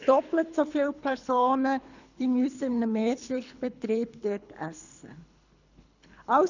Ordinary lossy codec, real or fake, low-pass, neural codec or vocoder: none; fake; 7.2 kHz; codec, 24 kHz, 3 kbps, HILCodec